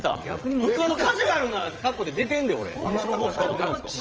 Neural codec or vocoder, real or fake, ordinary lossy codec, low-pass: vocoder, 22.05 kHz, 80 mel bands, Vocos; fake; Opus, 24 kbps; 7.2 kHz